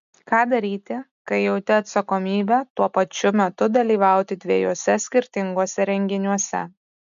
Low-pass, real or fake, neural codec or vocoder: 7.2 kHz; real; none